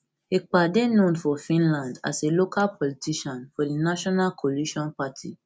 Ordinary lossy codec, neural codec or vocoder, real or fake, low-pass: none; none; real; none